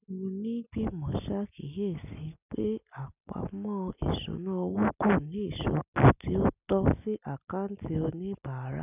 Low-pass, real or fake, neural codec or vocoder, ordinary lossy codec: 3.6 kHz; real; none; none